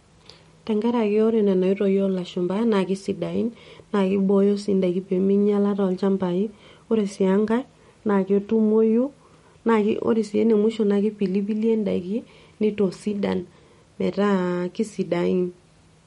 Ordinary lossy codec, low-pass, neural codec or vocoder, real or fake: MP3, 48 kbps; 19.8 kHz; none; real